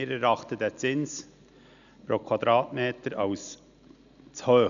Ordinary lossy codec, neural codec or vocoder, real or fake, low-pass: none; none; real; 7.2 kHz